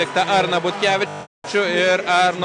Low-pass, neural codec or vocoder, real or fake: 9.9 kHz; none; real